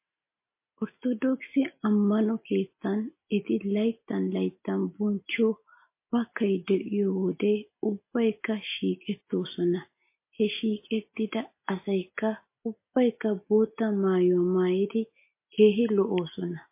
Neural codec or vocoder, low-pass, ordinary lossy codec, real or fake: none; 3.6 kHz; MP3, 24 kbps; real